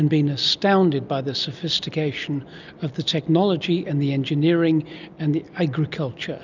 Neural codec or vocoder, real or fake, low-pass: none; real; 7.2 kHz